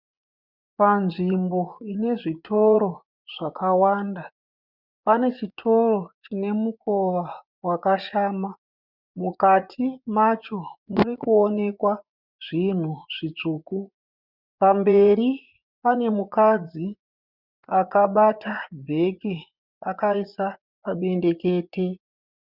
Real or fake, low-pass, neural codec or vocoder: fake; 5.4 kHz; vocoder, 24 kHz, 100 mel bands, Vocos